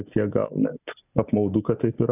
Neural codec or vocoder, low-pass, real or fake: none; 3.6 kHz; real